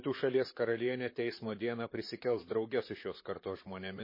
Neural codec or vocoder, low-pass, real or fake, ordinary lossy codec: vocoder, 44.1 kHz, 128 mel bands, Pupu-Vocoder; 5.4 kHz; fake; MP3, 24 kbps